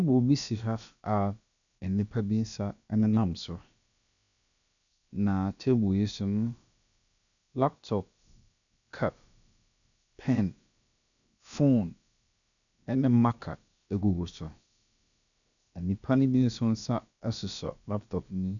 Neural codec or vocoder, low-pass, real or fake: codec, 16 kHz, about 1 kbps, DyCAST, with the encoder's durations; 7.2 kHz; fake